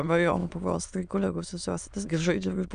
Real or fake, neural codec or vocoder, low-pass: fake; autoencoder, 22.05 kHz, a latent of 192 numbers a frame, VITS, trained on many speakers; 9.9 kHz